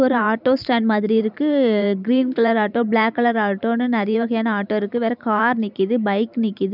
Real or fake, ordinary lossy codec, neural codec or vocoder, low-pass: fake; none; vocoder, 44.1 kHz, 128 mel bands every 512 samples, BigVGAN v2; 5.4 kHz